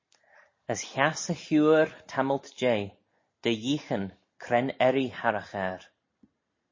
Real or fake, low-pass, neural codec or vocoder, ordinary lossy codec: real; 7.2 kHz; none; MP3, 32 kbps